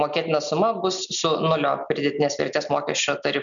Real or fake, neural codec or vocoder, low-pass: real; none; 7.2 kHz